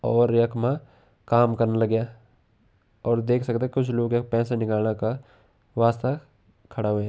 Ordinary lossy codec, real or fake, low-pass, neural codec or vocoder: none; real; none; none